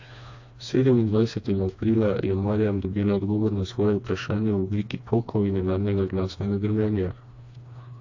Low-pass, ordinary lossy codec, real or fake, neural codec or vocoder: 7.2 kHz; AAC, 48 kbps; fake; codec, 16 kHz, 2 kbps, FreqCodec, smaller model